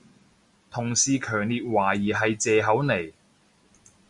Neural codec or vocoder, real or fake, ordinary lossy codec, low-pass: none; real; Opus, 64 kbps; 10.8 kHz